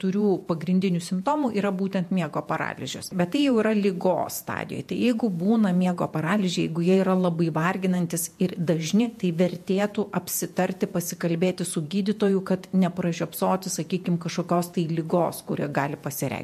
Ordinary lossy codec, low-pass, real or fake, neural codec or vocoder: MP3, 64 kbps; 14.4 kHz; fake; vocoder, 48 kHz, 128 mel bands, Vocos